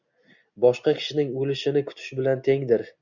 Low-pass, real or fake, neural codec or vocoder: 7.2 kHz; real; none